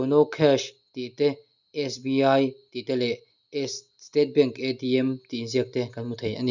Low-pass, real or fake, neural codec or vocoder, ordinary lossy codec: 7.2 kHz; real; none; none